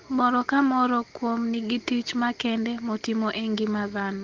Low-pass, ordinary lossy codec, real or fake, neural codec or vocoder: 7.2 kHz; Opus, 16 kbps; real; none